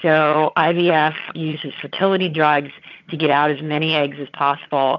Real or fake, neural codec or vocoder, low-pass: fake; vocoder, 22.05 kHz, 80 mel bands, HiFi-GAN; 7.2 kHz